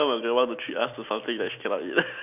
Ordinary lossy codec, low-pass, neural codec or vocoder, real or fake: none; 3.6 kHz; none; real